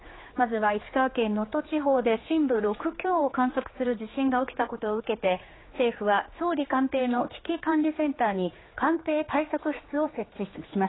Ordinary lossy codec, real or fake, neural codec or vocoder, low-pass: AAC, 16 kbps; fake; codec, 16 kHz, 4 kbps, X-Codec, HuBERT features, trained on general audio; 7.2 kHz